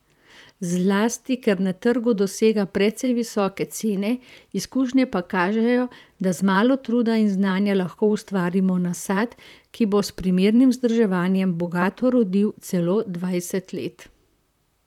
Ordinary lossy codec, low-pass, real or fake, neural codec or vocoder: none; 19.8 kHz; fake; vocoder, 44.1 kHz, 128 mel bands, Pupu-Vocoder